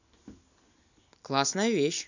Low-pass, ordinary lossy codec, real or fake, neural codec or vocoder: 7.2 kHz; none; real; none